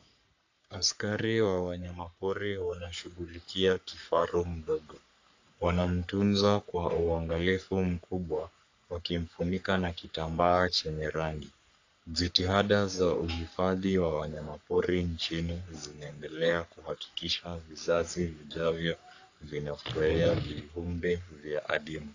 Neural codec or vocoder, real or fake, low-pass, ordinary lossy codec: codec, 44.1 kHz, 3.4 kbps, Pupu-Codec; fake; 7.2 kHz; AAC, 48 kbps